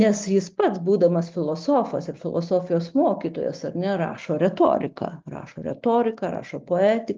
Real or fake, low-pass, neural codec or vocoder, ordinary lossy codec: real; 7.2 kHz; none; Opus, 32 kbps